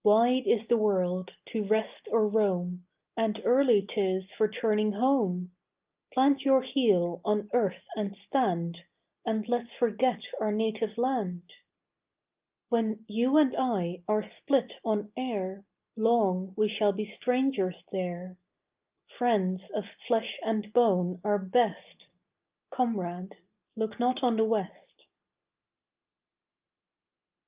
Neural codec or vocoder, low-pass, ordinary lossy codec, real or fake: none; 3.6 kHz; Opus, 24 kbps; real